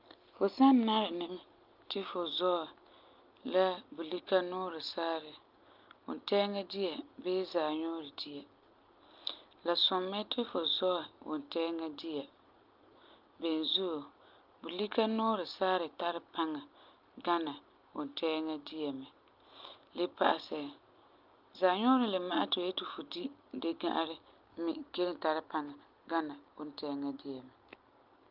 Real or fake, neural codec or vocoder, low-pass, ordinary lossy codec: real; none; 5.4 kHz; Opus, 24 kbps